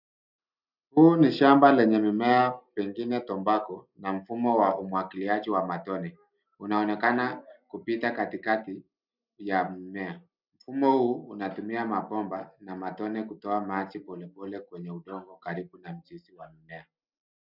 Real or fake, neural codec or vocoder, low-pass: real; none; 5.4 kHz